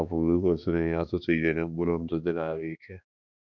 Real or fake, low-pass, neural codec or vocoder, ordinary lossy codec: fake; 7.2 kHz; codec, 16 kHz, 2 kbps, X-Codec, HuBERT features, trained on balanced general audio; none